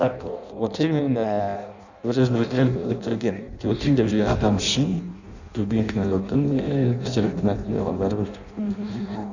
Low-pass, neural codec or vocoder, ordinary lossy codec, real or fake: 7.2 kHz; codec, 16 kHz in and 24 kHz out, 0.6 kbps, FireRedTTS-2 codec; none; fake